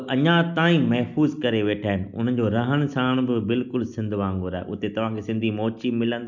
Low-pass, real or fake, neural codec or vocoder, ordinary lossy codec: 7.2 kHz; real; none; none